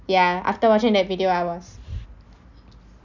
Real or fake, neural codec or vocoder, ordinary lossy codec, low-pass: real; none; none; 7.2 kHz